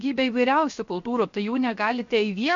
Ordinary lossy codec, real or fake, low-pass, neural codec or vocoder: MP3, 48 kbps; fake; 7.2 kHz; codec, 16 kHz, 0.7 kbps, FocalCodec